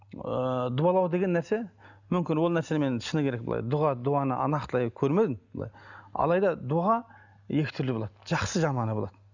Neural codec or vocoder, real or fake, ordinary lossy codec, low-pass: none; real; none; 7.2 kHz